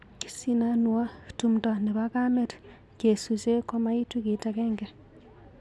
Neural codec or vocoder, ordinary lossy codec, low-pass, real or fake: none; none; none; real